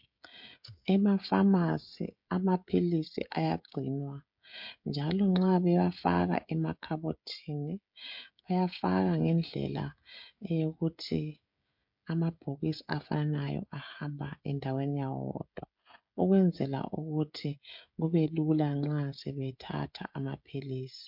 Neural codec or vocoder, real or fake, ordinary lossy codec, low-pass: codec, 16 kHz, 16 kbps, FreqCodec, smaller model; fake; MP3, 48 kbps; 5.4 kHz